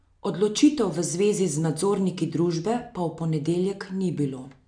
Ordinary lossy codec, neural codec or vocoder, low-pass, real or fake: AAC, 64 kbps; none; 9.9 kHz; real